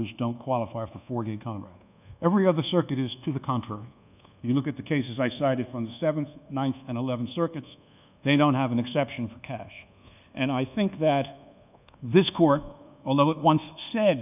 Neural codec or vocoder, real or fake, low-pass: codec, 24 kHz, 1.2 kbps, DualCodec; fake; 3.6 kHz